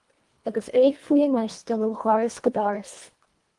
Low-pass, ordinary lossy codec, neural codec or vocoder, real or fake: 10.8 kHz; Opus, 24 kbps; codec, 24 kHz, 1.5 kbps, HILCodec; fake